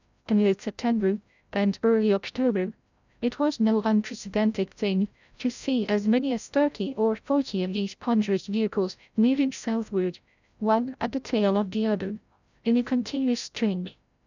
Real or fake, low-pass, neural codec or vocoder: fake; 7.2 kHz; codec, 16 kHz, 0.5 kbps, FreqCodec, larger model